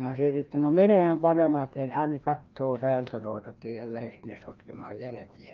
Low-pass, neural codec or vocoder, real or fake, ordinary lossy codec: 7.2 kHz; codec, 16 kHz, 1 kbps, FreqCodec, larger model; fake; Opus, 24 kbps